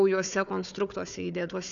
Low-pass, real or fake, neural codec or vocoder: 7.2 kHz; fake; codec, 16 kHz, 4 kbps, FunCodec, trained on Chinese and English, 50 frames a second